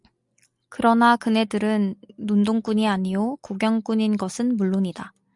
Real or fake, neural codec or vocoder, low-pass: real; none; 10.8 kHz